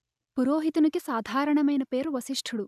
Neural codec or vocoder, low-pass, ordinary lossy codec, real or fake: none; 14.4 kHz; none; real